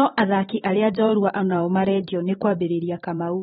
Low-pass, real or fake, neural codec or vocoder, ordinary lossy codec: 19.8 kHz; fake; vocoder, 44.1 kHz, 128 mel bands every 512 samples, BigVGAN v2; AAC, 16 kbps